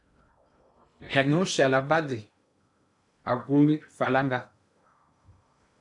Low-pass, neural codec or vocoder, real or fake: 10.8 kHz; codec, 16 kHz in and 24 kHz out, 0.6 kbps, FocalCodec, streaming, 2048 codes; fake